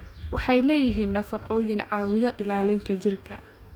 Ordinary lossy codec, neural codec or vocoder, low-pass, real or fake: none; codec, 44.1 kHz, 2.6 kbps, DAC; 19.8 kHz; fake